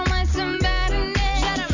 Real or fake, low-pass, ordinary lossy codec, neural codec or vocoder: real; 7.2 kHz; none; none